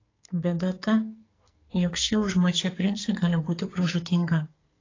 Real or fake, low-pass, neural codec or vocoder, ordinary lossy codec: fake; 7.2 kHz; codec, 44.1 kHz, 2.6 kbps, SNAC; AAC, 32 kbps